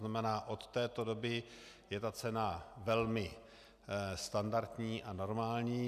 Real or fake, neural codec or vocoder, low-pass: real; none; 14.4 kHz